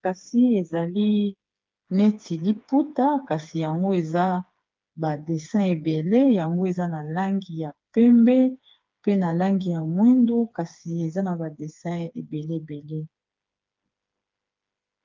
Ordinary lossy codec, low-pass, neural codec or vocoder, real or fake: Opus, 24 kbps; 7.2 kHz; codec, 16 kHz, 4 kbps, FreqCodec, smaller model; fake